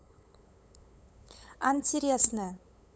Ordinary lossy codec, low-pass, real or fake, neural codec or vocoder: none; none; fake; codec, 16 kHz, 8 kbps, FunCodec, trained on LibriTTS, 25 frames a second